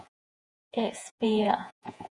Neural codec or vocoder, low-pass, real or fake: vocoder, 48 kHz, 128 mel bands, Vocos; 10.8 kHz; fake